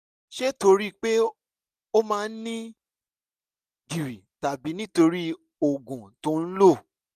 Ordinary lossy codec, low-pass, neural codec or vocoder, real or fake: none; 14.4 kHz; none; real